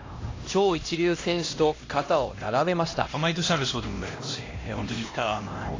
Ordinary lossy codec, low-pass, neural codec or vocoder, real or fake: AAC, 32 kbps; 7.2 kHz; codec, 16 kHz, 1 kbps, X-Codec, HuBERT features, trained on LibriSpeech; fake